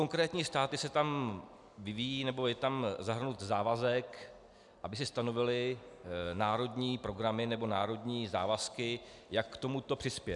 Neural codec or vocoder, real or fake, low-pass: none; real; 10.8 kHz